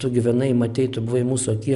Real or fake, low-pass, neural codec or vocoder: real; 10.8 kHz; none